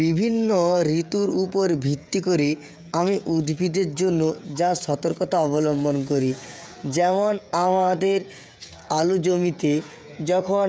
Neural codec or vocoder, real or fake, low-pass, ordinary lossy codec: codec, 16 kHz, 16 kbps, FreqCodec, smaller model; fake; none; none